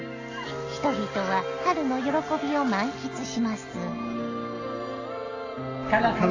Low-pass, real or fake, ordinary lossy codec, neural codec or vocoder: 7.2 kHz; real; AAC, 32 kbps; none